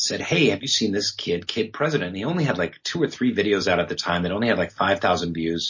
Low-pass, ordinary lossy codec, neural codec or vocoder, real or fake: 7.2 kHz; MP3, 32 kbps; none; real